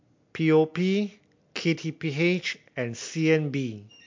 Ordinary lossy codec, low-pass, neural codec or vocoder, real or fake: MP3, 48 kbps; 7.2 kHz; none; real